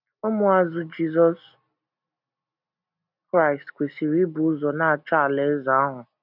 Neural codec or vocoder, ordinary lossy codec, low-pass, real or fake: none; none; 5.4 kHz; real